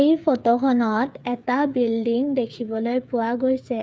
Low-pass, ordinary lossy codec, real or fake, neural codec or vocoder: none; none; fake; codec, 16 kHz, 8 kbps, FreqCodec, smaller model